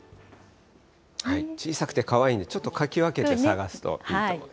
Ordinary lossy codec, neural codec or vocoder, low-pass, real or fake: none; none; none; real